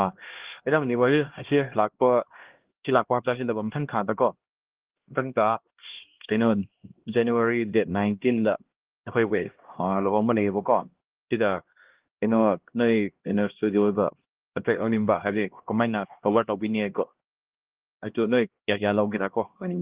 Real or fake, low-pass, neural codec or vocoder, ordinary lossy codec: fake; 3.6 kHz; codec, 16 kHz, 1 kbps, X-Codec, HuBERT features, trained on LibriSpeech; Opus, 16 kbps